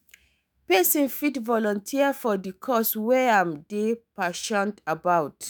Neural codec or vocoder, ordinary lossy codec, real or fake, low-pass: autoencoder, 48 kHz, 128 numbers a frame, DAC-VAE, trained on Japanese speech; none; fake; none